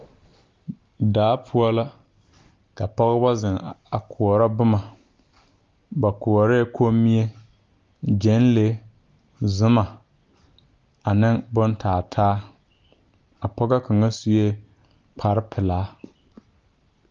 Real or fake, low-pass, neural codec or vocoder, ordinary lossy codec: real; 7.2 kHz; none; Opus, 32 kbps